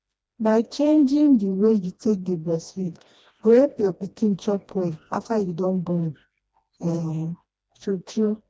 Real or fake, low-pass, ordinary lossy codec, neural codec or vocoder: fake; none; none; codec, 16 kHz, 1 kbps, FreqCodec, smaller model